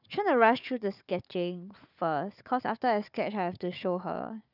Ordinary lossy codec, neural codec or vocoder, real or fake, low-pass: none; codec, 16 kHz, 4 kbps, FunCodec, trained on Chinese and English, 50 frames a second; fake; 5.4 kHz